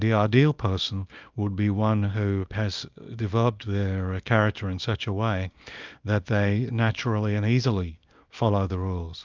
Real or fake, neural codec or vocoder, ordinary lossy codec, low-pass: fake; codec, 24 kHz, 0.9 kbps, WavTokenizer, small release; Opus, 24 kbps; 7.2 kHz